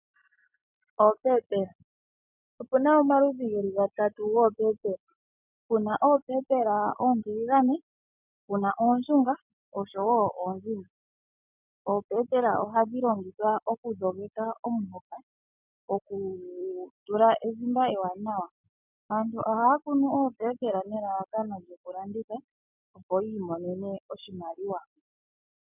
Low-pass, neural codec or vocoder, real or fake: 3.6 kHz; none; real